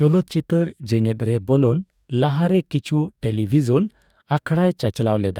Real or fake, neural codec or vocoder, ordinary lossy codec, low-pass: fake; codec, 44.1 kHz, 2.6 kbps, DAC; none; 19.8 kHz